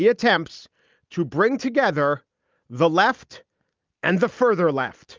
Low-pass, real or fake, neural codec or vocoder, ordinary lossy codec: 7.2 kHz; real; none; Opus, 32 kbps